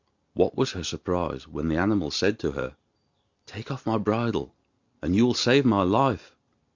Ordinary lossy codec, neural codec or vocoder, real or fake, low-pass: Opus, 64 kbps; none; real; 7.2 kHz